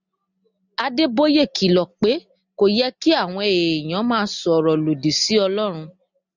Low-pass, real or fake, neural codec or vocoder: 7.2 kHz; real; none